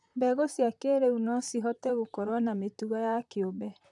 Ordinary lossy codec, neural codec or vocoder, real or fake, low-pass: none; vocoder, 44.1 kHz, 128 mel bands, Pupu-Vocoder; fake; 10.8 kHz